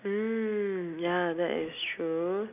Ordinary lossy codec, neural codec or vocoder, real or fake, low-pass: none; none; real; 3.6 kHz